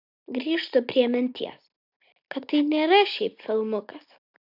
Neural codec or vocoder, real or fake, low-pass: none; real; 5.4 kHz